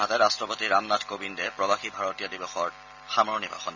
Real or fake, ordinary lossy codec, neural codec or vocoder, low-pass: real; none; none; 7.2 kHz